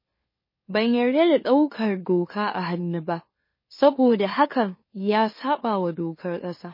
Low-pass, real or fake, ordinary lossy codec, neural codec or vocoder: 5.4 kHz; fake; MP3, 24 kbps; autoencoder, 44.1 kHz, a latent of 192 numbers a frame, MeloTTS